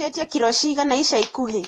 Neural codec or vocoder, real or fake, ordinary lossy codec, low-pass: vocoder, 48 kHz, 128 mel bands, Vocos; fake; AAC, 32 kbps; 19.8 kHz